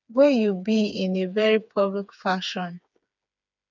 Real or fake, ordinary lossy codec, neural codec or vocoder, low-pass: fake; none; codec, 16 kHz, 4 kbps, FreqCodec, smaller model; 7.2 kHz